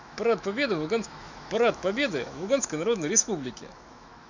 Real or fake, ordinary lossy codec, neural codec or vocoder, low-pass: fake; none; autoencoder, 48 kHz, 128 numbers a frame, DAC-VAE, trained on Japanese speech; 7.2 kHz